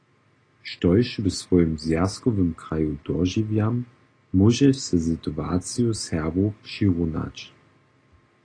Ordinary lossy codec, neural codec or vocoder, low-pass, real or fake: AAC, 32 kbps; none; 9.9 kHz; real